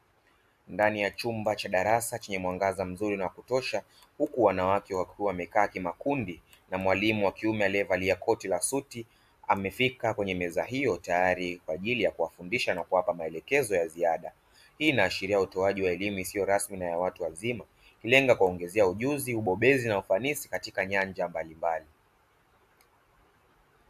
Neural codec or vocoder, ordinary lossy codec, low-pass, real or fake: none; AAC, 96 kbps; 14.4 kHz; real